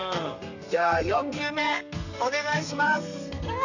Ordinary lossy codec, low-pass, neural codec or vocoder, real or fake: none; 7.2 kHz; codec, 32 kHz, 1.9 kbps, SNAC; fake